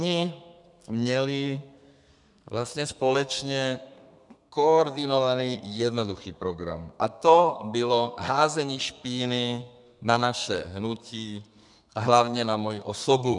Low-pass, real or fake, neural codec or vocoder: 10.8 kHz; fake; codec, 32 kHz, 1.9 kbps, SNAC